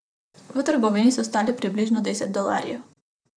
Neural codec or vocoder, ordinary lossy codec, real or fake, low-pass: vocoder, 44.1 kHz, 128 mel bands, Pupu-Vocoder; none; fake; 9.9 kHz